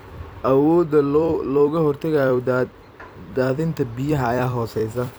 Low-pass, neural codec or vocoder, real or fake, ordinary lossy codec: none; vocoder, 44.1 kHz, 128 mel bands every 256 samples, BigVGAN v2; fake; none